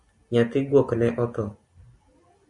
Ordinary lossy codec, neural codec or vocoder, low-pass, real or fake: MP3, 48 kbps; none; 10.8 kHz; real